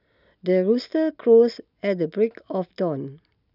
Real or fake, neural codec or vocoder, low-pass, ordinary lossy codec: real; none; 5.4 kHz; none